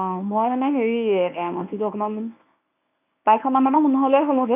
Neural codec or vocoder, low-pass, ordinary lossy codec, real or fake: codec, 24 kHz, 0.9 kbps, WavTokenizer, medium speech release version 1; 3.6 kHz; none; fake